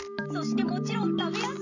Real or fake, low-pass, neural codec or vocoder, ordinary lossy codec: real; 7.2 kHz; none; none